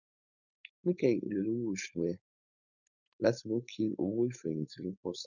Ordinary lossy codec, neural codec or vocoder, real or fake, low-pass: none; codec, 16 kHz, 4.8 kbps, FACodec; fake; 7.2 kHz